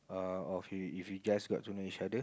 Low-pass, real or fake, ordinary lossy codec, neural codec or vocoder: none; real; none; none